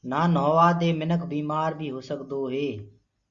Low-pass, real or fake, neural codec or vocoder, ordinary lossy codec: 7.2 kHz; real; none; Opus, 64 kbps